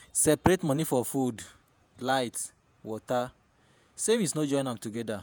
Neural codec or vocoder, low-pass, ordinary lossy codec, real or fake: vocoder, 48 kHz, 128 mel bands, Vocos; none; none; fake